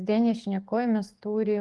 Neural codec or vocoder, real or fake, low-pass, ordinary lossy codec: codec, 44.1 kHz, 7.8 kbps, DAC; fake; 10.8 kHz; Opus, 24 kbps